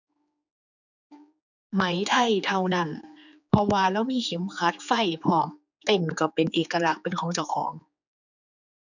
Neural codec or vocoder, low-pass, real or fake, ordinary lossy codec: codec, 16 kHz, 4 kbps, X-Codec, HuBERT features, trained on general audio; 7.2 kHz; fake; none